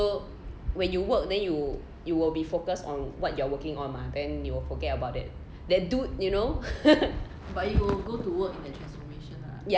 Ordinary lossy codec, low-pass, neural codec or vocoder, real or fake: none; none; none; real